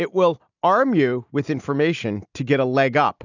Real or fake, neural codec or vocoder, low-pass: real; none; 7.2 kHz